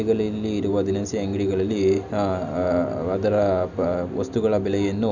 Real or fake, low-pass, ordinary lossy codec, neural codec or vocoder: real; 7.2 kHz; none; none